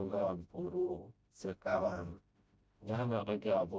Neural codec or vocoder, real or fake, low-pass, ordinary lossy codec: codec, 16 kHz, 0.5 kbps, FreqCodec, smaller model; fake; none; none